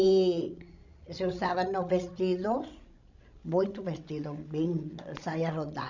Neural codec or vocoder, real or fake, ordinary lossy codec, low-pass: codec, 16 kHz, 16 kbps, FreqCodec, larger model; fake; none; 7.2 kHz